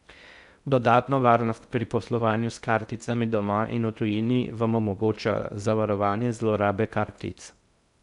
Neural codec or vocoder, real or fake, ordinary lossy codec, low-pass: codec, 16 kHz in and 24 kHz out, 0.6 kbps, FocalCodec, streaming, 2048 codes; fake; none; 10.8 kHz